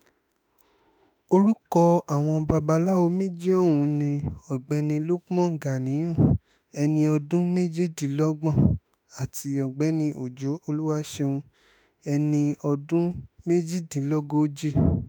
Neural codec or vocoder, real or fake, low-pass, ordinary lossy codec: autoencoder, 48 kHz, 32 numbers a frame, DAC-VAE, trained on Japanese speech; fake; none; none